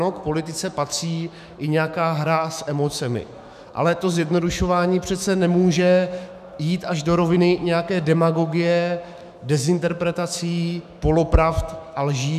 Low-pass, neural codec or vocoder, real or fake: 14.4 kHz; autoencoder, 48 kHz, 128 numbers a frame, DAC-VAE, trained on Japanese speech; fake